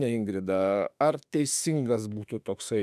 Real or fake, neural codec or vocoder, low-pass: fake; autoencoder, 48 kHz, 32 numbers a frame, DAC-VAE, trained on Japanese speech; 14.4 kHz